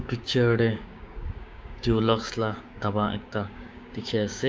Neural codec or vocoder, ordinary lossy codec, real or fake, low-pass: codec, 16 kHz, 6 kbps, DAC; none; fake; none